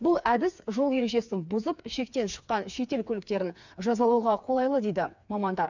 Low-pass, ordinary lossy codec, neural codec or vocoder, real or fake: 7.2 kHz; none; codec, 16 kHz, 4 kbps, FreqCodec, smaller model; fake